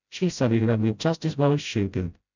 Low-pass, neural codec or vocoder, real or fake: 7.2 kHz; codec, 16 kHz, 0.5 kbps, FreqCodec, smaller model; fake